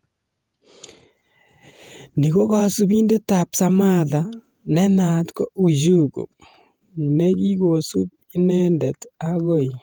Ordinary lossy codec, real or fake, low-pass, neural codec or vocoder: Opus, 32 kbps; fake; 19.8 kHz; vocoder, 48 kHz, 128 mel bands, Vocos